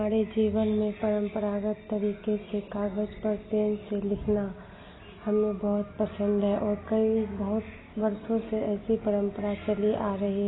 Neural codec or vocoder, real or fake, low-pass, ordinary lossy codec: none; real; 7.2 kHz; AAC, 16 kbps